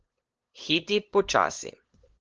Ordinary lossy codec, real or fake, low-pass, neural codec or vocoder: Opus, 16 kbps; fake; 7.2 kHz; codec, 16 kHz, 8 kbps, FunCodec, trained on LibriTTS, 25 frames a second